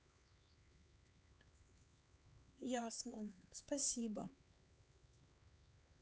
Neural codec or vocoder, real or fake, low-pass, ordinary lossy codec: codec, 16 kHz, 4 kbps, X-Codec, HuBERT features, trained on LibriSpeech; fake; none; none